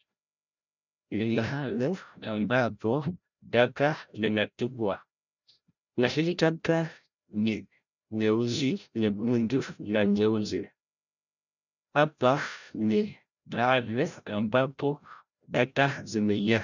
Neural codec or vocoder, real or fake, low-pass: codec, 16 kHz, 0.5 kbps, FreqCodec, larger model; fake; 7.2 kHz